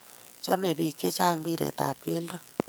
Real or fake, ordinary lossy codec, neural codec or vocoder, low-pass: fake; none; codec, 44.1 kHz, 2.6 kbps, SNAC; none